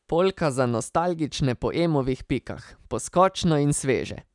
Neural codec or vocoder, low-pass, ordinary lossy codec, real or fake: none; 10.8 kHz; none; real